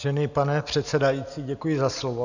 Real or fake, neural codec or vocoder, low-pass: real; none; 7.2 kHz